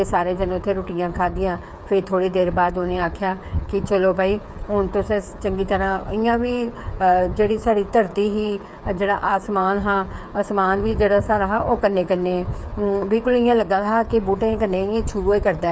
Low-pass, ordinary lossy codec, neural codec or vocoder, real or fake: none; none; codec, 16 kHz, 8 kbps, FreqCodec, smaller model; fake